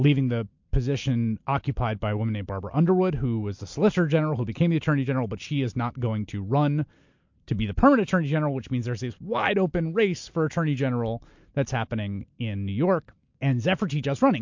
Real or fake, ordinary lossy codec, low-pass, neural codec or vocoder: real; MP3, 48 kbps; 7.2 kHz; none